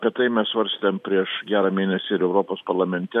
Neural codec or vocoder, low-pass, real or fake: none; 14.4 kHz; real